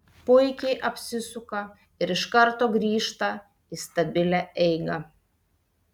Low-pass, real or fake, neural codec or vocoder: 19.8 kHz; real; none